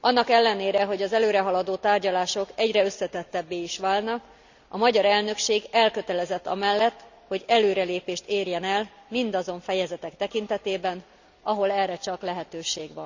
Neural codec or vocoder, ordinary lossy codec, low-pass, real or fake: none; Opus, 64 kbps; 7.2 kHz; real